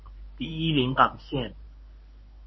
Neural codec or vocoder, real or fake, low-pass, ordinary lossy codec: codec, 24 kHz, 0.9 kbps, WavTokenizer, medium speech release version 1; fake; 7.2 kHz; MP3, 24 kbps